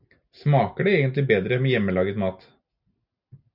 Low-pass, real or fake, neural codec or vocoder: 5.4 kHz; real; none